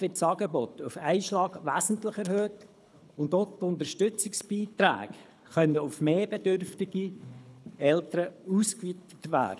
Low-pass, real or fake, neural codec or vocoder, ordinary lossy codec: none; fake; codec, 24 kHz, 6 kbps, HILCodec; none